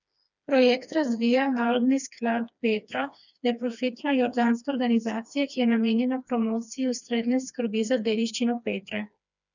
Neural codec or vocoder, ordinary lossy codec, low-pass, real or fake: codec, 16 kHz, 2 kbps, FreqCodec, smaller model; none; 7.2 kHz; fake